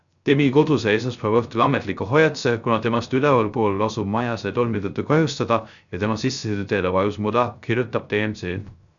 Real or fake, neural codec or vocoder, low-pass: fake; codec, 16 kHz, 0.3 kbps, FocalCodec; 7.2 kHz